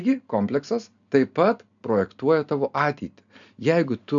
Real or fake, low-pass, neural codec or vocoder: real; 7.2 kHz; none